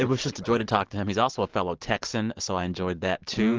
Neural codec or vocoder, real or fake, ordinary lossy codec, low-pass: none; real; Opus, 16 kbps; 7.2 kHz